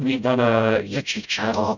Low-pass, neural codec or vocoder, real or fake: 7.2 kHz; codec, 16 kHz, 0.5 kbps, FreqCodec, smaller model; fake